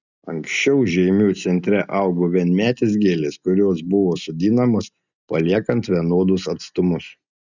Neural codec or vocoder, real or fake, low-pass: none; real; 7.2 kHz